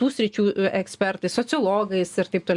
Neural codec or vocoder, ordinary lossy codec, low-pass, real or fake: none; Opus, 64 kbps; 10.8 kHz; real